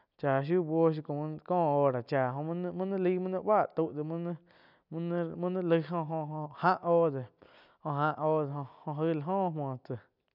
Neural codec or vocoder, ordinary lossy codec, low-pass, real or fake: none; none; 5.4 kHz; real